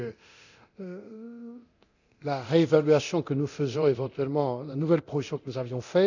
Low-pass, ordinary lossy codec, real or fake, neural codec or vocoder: 7.2 kHz; none; fake; codec, 24 kHz, 0.9 kbps, DualCodec